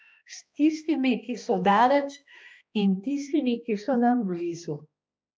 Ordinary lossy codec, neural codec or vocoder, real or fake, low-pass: none; codec, 16 kHz, 1 kbps, X-Codec, HuBERT features, trained on balanced general audio; fake; none